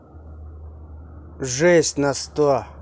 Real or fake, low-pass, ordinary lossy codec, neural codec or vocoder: real; none; none; none